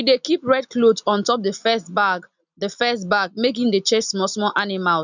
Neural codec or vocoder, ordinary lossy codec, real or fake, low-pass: none; none; real; 7.2 kHz